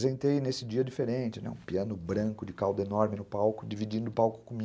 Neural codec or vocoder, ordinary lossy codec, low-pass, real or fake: none; none; none; real